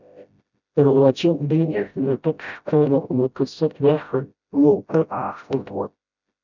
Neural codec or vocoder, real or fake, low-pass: codec, 16 kHz, 0.5 kbps, FreqCodec, smaller model; fake; 7.2 kHz